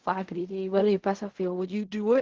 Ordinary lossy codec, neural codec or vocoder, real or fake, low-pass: Opus, 16 kbps; codec, 16 kHz in and 24 kHz out, 0.4 kbps, LongCat-Audio-Codec, fine tuned four codebook decoder; fake; 7.2 kHz